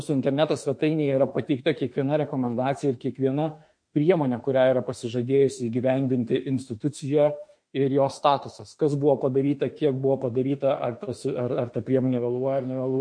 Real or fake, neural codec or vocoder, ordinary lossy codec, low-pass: fake; autoencoder, 48 kHz, 32 numbers a frame, DAC-VAE, trained on Japanese speech; MP3, 48 kbps; 9.9 kHz